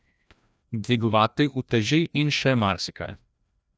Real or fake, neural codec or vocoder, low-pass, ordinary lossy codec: fake; codec, 16 kHz, 1 kbps, FreqCodec, larger model; none; none